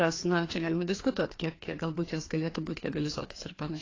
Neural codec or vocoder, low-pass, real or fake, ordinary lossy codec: codec, 16 kHz, 2 kbps, FreqCodec, larger model; 7.2 kHz; fake; AAC, 32 kbps